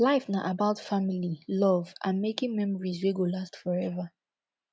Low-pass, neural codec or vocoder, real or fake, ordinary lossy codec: none; none; real; none